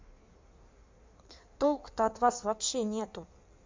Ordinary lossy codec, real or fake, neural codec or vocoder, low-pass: MP3, 48 kbps; fake; codec, 16 kHz in and 24 kHz out, 1.1 kbps, FireRedTTS-2 codec; 7.2 kHz